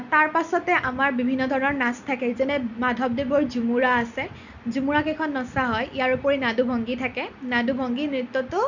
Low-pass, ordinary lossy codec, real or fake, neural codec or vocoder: 7.2 kHz; none; real; none